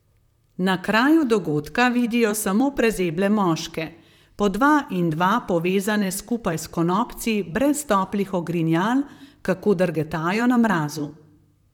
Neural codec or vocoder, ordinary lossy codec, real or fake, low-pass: vocoder, 44.1 kHz, 128 mel bands, Pupu-Vocoder; none; fake; 19.8 kHz